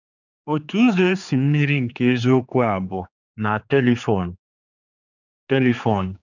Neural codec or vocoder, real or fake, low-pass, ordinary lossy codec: codec, 16 kHz, 4 kbps, X-Codec, HuBERT features, trained on general audio; fake; 7.2 kHz; none